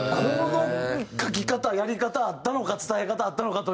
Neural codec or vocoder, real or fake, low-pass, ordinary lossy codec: none; real; none; none